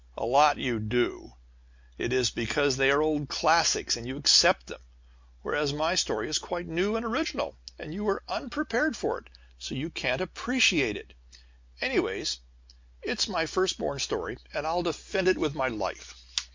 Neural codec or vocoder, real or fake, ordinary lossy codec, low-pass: none; real; MP3, 64 kbps; 7.2 kHz